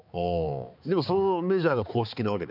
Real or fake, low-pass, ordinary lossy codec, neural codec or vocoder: fake; 5.4 kHz; none; codec, 16 kHz, 4 kbps, X-Codec, HuBERT features, trained on balanced general audio